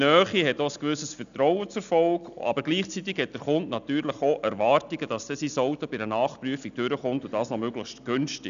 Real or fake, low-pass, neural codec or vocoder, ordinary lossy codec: real; 7.2 kHz; none; none